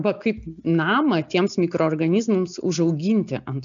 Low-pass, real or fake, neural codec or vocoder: 7.2 kHz; real; none